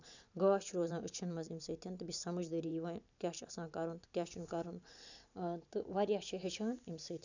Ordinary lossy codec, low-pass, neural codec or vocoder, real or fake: none; 7.2 kHz; vocoder, 44.1 kHz, 128 mel bands every 512 samples, BigVGAN v2; fake